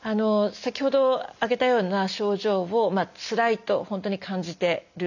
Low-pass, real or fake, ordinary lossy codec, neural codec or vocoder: 7.2 kHz; real; none; none